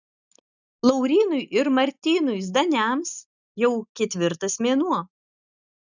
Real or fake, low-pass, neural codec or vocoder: real; 7.2 kHz; none